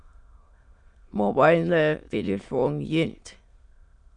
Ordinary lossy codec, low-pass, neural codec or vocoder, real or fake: Opus, 64 kbps; 9.9 kHz; autoencoder, 22.05 kHz, a latent of 192 numbers a frame, VITS, trained on many speakers; fake